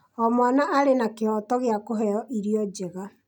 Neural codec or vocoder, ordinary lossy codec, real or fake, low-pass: none; none; real; 19.8 kHz